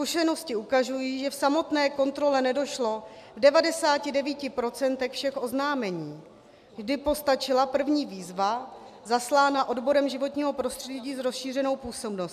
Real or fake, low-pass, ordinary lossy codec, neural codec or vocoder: real; 14.4 kHz; MP3, 96 kbps; none